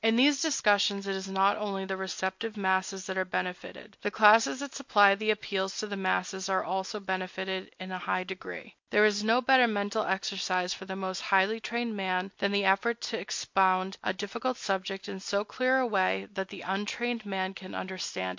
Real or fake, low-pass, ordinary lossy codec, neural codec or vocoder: real; 7.2 kHz; MP3, 48 kbps; none